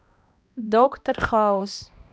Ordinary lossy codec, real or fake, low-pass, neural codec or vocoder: none; fake; none; codec, 16 kHz, 1 kbps, X-Codec, HuBERT features, trained on balanced general audio